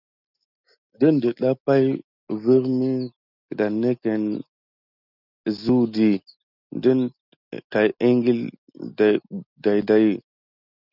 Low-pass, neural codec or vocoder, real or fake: 5.4 kHz; none; real